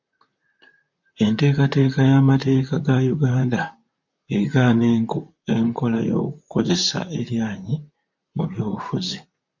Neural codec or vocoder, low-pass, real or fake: vocoder, 44.1 kHz, 128 mel bands, Pupu-Vocoder; 7.2 kHz; fake